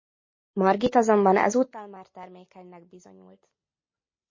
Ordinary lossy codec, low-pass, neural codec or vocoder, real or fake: MP3, 32 kbps; 7.2 kHz; none; real